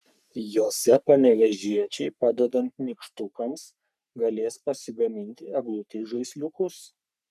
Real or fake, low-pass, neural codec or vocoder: fake; 14.4 kHz; codec, 44.1 kHz, 3.4 kbps, Pupu-Codec